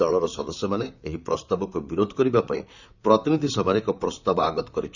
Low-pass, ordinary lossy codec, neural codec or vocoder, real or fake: 7.2 kHz; none; vocoder, 44.1 kHz, 128 mel bands, Pupu-Vocoder; fake